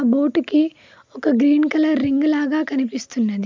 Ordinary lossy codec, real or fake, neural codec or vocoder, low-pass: MP3, 64 kbps; real; none; 7.2 kHz